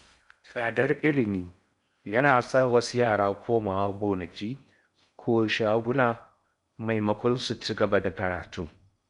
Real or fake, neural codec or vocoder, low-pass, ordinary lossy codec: fake; codec, 16 kHz in and 24 kHz out, 0.6 kbps, FocalCodec, streaming, 4096 codes; 10.8 kHz; none